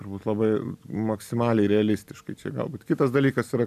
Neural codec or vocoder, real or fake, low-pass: none; real; 14.4 kHz